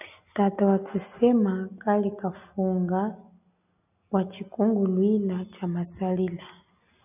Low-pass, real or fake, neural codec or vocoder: 3.6 kHz; real; none